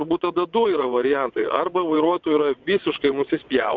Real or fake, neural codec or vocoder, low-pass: fake; vocoder, 22.05 kHz, 80 mel bands, WaveNeXt; 7.2 kHz